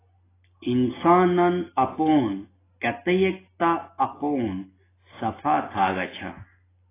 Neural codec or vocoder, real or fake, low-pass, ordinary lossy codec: none; real; 3.6 kHz; AAC, 16 kbps